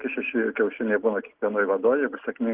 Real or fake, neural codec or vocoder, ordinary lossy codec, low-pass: real; none; Opus, 16 kbps; 3.6 kHz